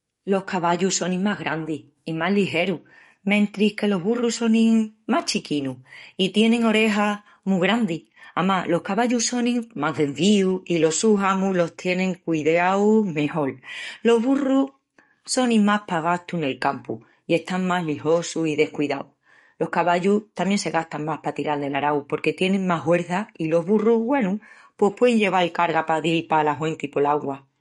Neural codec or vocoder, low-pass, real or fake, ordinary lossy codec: codec, 44.1 kHz, 7.8 kbps, DAC; 19.8 kHz; fake; MP3, 48 kbps